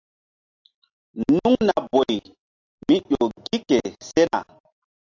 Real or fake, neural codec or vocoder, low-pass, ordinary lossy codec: real; none; 7.2 kHz; AAC, 48 kbps